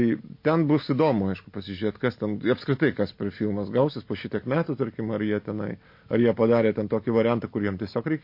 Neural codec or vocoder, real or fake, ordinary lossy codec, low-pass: none; real; MP3, 32 kbps; 5.4 kHz